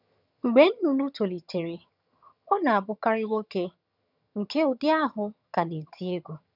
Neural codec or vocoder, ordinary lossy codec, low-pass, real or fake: vocoder, 22.05 kHz, 80 mel bands, HiFi-GAN; none; 5.4 kHz; fake